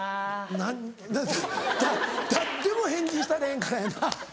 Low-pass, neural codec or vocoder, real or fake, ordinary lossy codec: none; none; real; none